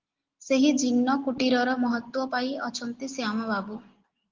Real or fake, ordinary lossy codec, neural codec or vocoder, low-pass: real; Opus, 16 kbps; none; 7.2 kHz